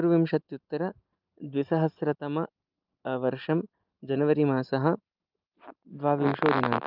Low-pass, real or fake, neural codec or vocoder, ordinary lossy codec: 5.4 kHz; real; none; Opus, 24 kbps